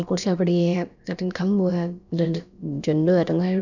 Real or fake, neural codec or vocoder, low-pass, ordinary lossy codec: fake; codec, 16 kHz, about 1 kbps, DyCAST, with the encoder's durations; 7.2 kHz; none